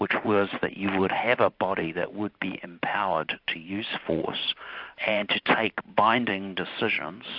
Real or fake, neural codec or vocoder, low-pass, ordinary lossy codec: fake; vocoder, 44.1 kHz, 128 mel bands every 512 samples, BigVGAN v2; 5.4 kHz; AAC, 48 kbps